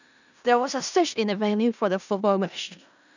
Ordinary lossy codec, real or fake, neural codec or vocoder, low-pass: none; fake; codec, 16 kHz in and 24 kHz out, 0.4 kbps, LongCat-Audio-Codec, four codebook decoder; 7.2 kHz